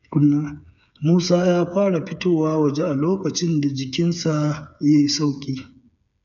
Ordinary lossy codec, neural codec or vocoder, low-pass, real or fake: none; codec, 16 kHz, 8 kbps, FreqCodec, smaller model; 7.2 kHz; fake